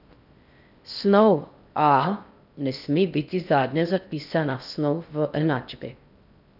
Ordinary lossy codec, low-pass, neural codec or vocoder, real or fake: none; 5.4 kHz; codec, 16 kHz in and 24 kHz out, 0.6 kbps, FocalCodec, streaming, 4096 codes; fake